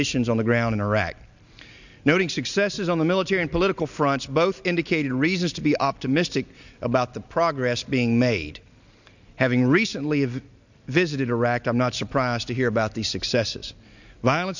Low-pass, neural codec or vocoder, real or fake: 7.2 kHz; none; real